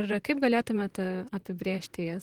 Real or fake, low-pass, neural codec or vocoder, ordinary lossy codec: fake; 19.8 kHz; vocoder, 44.1 kHz, 128 mel bands, Pupu-Vocoder; Opus, 16 kbps